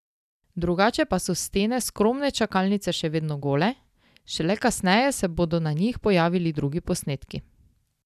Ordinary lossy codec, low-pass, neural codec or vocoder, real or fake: none; 14.4 kHz; none; real